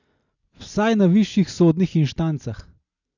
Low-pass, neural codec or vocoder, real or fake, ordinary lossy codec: 7.2 kHz; none; real; none